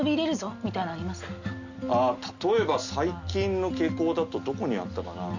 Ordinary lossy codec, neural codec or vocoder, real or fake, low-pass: none; none; real; 7.2 kHz